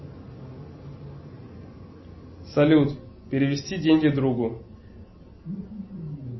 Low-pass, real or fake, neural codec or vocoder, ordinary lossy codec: 7.2 kHz; real; none; MP3, 24 kbps